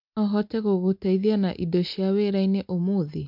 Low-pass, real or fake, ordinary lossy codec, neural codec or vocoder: 5.4 kHz; real; MP3, 48 kbps; none